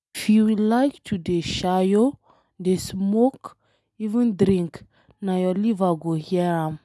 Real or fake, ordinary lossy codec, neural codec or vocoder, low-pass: real; none; none; none